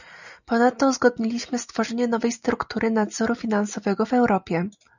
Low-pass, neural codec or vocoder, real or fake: 7.2 kHz; none; real